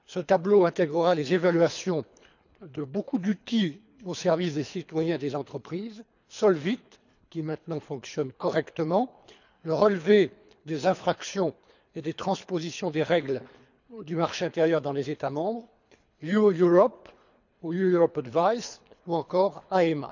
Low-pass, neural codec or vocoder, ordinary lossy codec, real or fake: 7.2 kHz; codec, 24 kHz, 3 kbps, HILCodec; none; fake